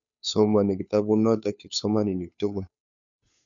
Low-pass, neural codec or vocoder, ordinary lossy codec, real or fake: 7.2 kHz; codec, 16 kHz, 2 kbps, FunCodec, trained on Chinese and English, 25 frames a second; none; fake